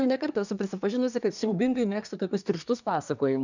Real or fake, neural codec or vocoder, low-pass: fake; codec, 24 kHz, 1 kbps, SNAC; 7.2 kHz